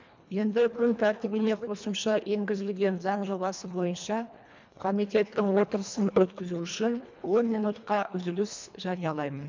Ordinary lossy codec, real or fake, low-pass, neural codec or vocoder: MP3, 64 kbps; fake; 7.2 kHz; codec, 24 kHz, 1.5 kbps, HILCodec